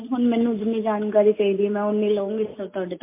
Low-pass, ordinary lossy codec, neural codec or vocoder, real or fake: 3.6 kHz; MP3, 24 kbps; none; real